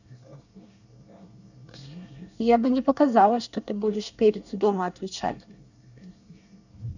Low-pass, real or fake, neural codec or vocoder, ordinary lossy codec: 7.2 kHz; fake; codec, 24 kHz, 1 kbps, SNAC; none